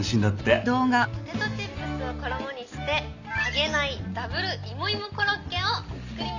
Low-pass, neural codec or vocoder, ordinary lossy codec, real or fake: 7.2 kHz; none; none; real